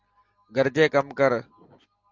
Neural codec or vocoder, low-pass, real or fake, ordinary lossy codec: none; 7.2 kHz; real; Opus, 32 kbps